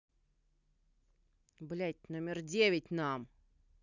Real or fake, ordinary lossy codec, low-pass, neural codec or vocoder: real; none; 7.2 kHz; none